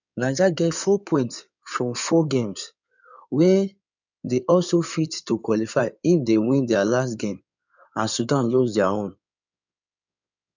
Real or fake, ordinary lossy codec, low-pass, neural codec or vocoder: fake; none; 7.2 kHz; codec, 16 kHz in and 24 kHz out, 2.2 kbps, FireRedTTS-2 codec